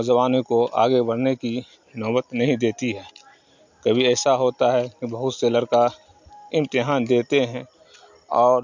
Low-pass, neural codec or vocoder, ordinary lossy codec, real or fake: 7.2 kHz; none; MP3, 64 kbps; real